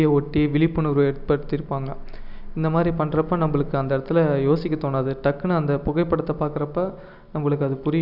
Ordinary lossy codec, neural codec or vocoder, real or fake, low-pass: none; none; real; 5.4 kHz